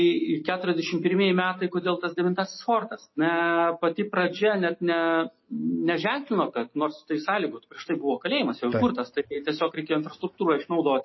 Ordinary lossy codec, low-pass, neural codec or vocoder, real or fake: MP3, 24 kbps; 7.2 kHz; none; real